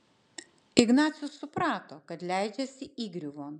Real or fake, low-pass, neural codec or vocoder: real; 10.8 kHz; none